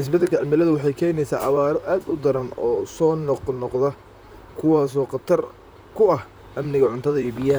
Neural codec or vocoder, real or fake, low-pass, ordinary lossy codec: vocoder, 44.1 kHz, 128 mel bands, Pupu-Vocoder; fake; none; none